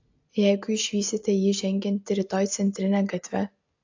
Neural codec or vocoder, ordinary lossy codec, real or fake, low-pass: none; AAC, 48 kbps; real; 7.2 kHz